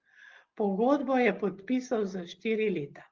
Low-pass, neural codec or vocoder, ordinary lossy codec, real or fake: 7.2 kHz; none; Opus, 16 kbps; real